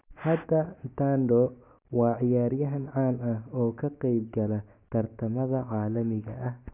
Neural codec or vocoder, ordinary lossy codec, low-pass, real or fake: none; none; 3.6 kHz; real